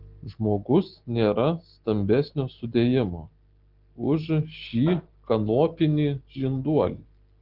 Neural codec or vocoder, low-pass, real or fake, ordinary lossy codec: none; 5.4 kHz; real; Opus, 16 kbps